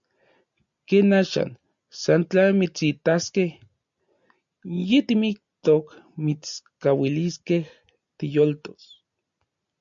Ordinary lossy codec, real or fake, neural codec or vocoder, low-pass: MP3, 96 kbps; real; none; 7.2 kHz